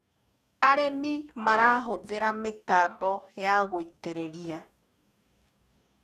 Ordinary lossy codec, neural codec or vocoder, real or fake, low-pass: none; codec, 44.1 kHz, 2.6 kbps, DAC; fake; 14.4 kHz